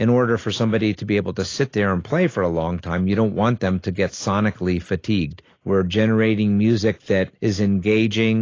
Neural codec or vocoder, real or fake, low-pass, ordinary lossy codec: none; real; 7.2 kHz; AAC, 32 kbps